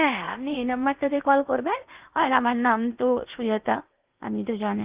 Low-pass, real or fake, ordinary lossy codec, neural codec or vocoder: 3.6 kHz; fake; Opus, 32 kbps; codec, 16 kHz in and 24 kHz out, 0.8 kbps, FocalCodec, streaming, 65536 codes